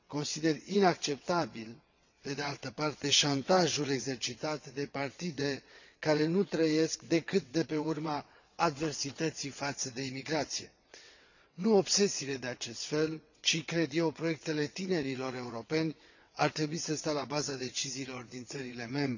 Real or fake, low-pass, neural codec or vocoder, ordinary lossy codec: fake; 7.2 kHz; vocoder, 22.05 kHz, 80 mel bands, WaveNeXt; none